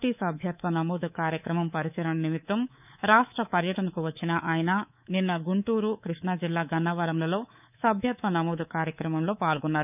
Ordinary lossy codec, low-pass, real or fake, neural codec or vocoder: none; 3.6 kHz; fake; codec, 24 kHz, 3.1 kbps, DualCodec